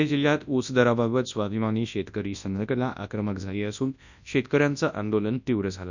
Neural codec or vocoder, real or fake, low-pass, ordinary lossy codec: codec, 24 kHz, 0.9 kbps, WavTokenizer, large speech release; fake; 7.2 kHz; none